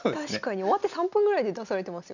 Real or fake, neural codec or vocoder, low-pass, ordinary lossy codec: real; none; 7.2 kHz; none